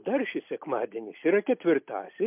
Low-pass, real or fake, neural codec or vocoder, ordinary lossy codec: 3.6 kHz; real; none; MP3, 32 kbps